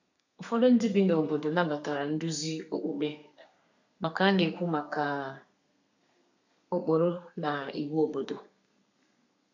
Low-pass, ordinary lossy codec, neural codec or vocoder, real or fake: 7.2 kHz; AAC, 48 kbps; codec, 32 kHz, 1.9 kbps, SNAC; fake